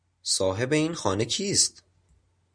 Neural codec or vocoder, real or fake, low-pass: none; real; 9.9 kHz